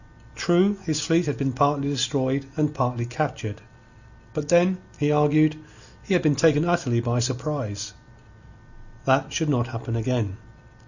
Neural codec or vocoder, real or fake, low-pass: none; real; 7.2 kHz